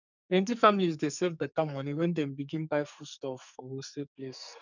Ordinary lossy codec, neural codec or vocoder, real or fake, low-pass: none; codec, 44.1 kHz, 2.6 kbps, SNAC; fake; 7.2 kHz